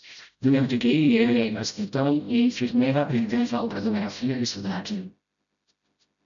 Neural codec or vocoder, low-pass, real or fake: codec, 16 kHz, 0.5 kbps, FreqCodec, smaller model; 7.2 kHz; fake